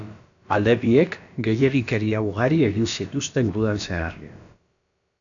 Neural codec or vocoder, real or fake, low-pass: codec, 16 kHz, about 1 kbps, DyCAST, with the encoder's durations; fake; 7.2 kHz